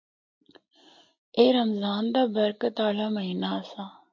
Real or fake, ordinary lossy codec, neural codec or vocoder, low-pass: real; MP3, 48 kbps; none; 7.2 kHz